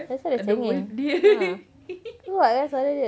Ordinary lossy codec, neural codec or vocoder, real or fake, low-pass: none; none; real; none